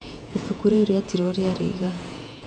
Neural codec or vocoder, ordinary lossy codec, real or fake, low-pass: vocoder, 48 kHz, 128 mel bands, Vocos; none; fake; 9.9 kHz